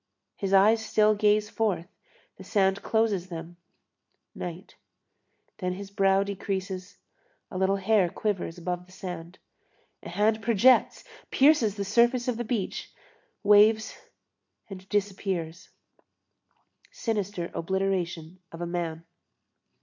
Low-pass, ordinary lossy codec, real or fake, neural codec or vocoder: 7.2 kHz; MP3, 64 kbps; real; none